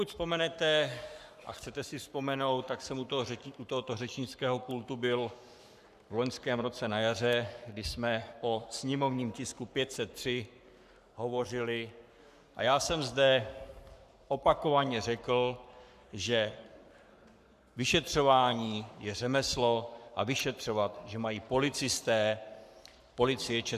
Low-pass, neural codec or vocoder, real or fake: 14.4 kHz; codec, 44.1 kHz, 7.8 kbps, Pupu-Codec; fake